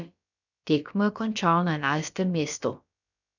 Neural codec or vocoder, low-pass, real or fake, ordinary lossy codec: codec, 16 kHz, about 1 kbps, DyCAST, with the encoder's durations; 7.2 kHz; fake; none